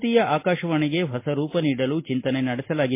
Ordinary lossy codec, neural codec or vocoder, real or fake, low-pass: MP3, 24 kbps; none; real; 3.6 kHz